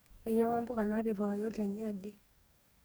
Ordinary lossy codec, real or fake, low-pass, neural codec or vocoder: none; fake; none; codec, 44.1 kHz, 2.6 kbps, DAC